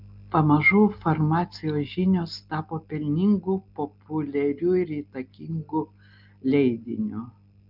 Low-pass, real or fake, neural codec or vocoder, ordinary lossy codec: 5.4 kHz; real; none; Opus, 32 kbps